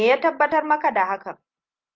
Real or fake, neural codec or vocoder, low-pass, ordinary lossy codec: real; none; 7.2 kHz; Opus, 24 kbps